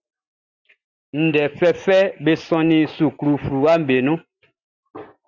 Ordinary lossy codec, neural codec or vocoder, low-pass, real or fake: Opus, 64 kbps; none; 7.2 kHz; real